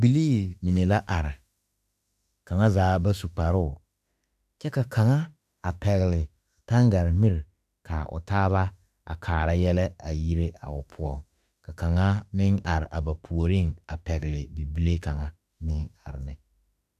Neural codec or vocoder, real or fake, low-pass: autoencoder, 48 kHz, 32 numbers a frame, DAC-VAE, trained on Japanese speech; fake; 14.4 kHz